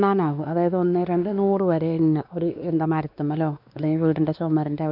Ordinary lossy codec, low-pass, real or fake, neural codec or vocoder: none; 5.4 kHz; fake; codec, 16 kHz, 2 kbps, X-Codec, WavLM features, trained on Multilingual LibriSpeech